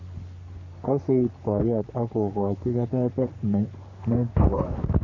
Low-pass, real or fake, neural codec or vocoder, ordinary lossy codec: 7.2 kHz; fake; codec, 44.1 kHz, 3.4 kbps, Pupu-Codec; none